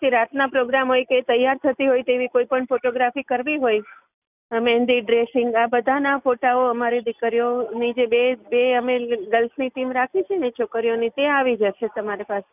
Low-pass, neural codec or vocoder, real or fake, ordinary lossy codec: 3.6 kHz; none; real; none